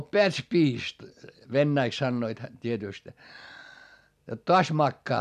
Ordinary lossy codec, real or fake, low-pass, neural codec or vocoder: none; real; 14.4 kHz; none